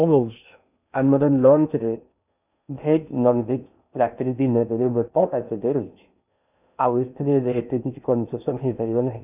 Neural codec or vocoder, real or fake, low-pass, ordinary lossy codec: codec, 16 kHz in and 24 kHz out, 0.6 kbps, FocalCodec, streaming, 2048 codes; fake; 3.6 kHz; none